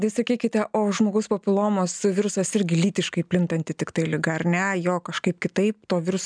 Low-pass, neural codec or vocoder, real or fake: 9.9 kHz; none; real